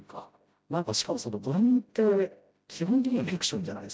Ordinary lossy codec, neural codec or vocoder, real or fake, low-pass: none; codec, 16 kHz, 0.5 kbps, FreqCodec, smaller model; fake; none